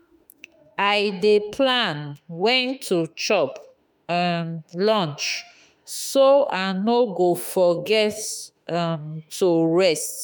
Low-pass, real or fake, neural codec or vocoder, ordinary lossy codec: none; fake; autoencoder, 48 kHz, 32 numbers a frame, DAC-VAE, trained on Japanese speech; none